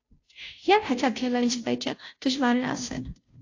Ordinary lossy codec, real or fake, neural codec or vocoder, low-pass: AAC, 48 kbps; fake; codec, 16 kHz, 0.5 kbps, FunCodec, trained on Chinese and English, 25 frames a second; 7.2 kHz